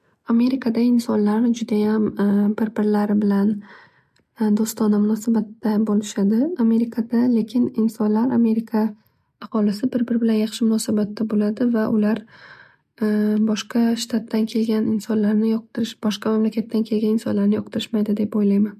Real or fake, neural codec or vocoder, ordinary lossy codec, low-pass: real; none; MP3, 64 kbps; 14.4 kHz